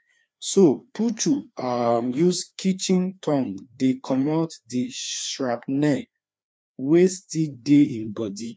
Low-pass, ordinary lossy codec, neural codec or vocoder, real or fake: none; none; codec, 16 kHz, 2 kbps, FreqCodec, larger model; fake